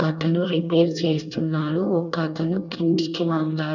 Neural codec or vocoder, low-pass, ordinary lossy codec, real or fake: codec, 24 kHz, 1 kbps, SNAC; 7.2 kHz; none; fake